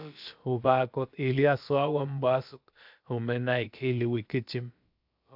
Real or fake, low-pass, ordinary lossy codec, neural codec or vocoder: fake; 5.4 kHz; AAC, 48 kbps; codec, 16 kHz, about 1 kbps, DyCAST, with the encoder's durations